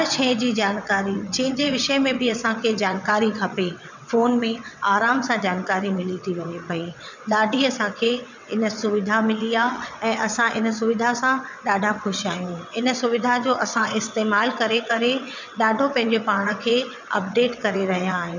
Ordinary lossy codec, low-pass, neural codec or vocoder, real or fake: none; 7.2 kHz; vocoder, 22.05 kHz, 80 mel bands, WaveNeXt; fake